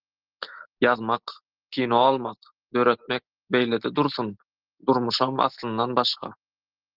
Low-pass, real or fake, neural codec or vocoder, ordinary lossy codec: 5.4 kHz; real; none; Opus, 16 kbps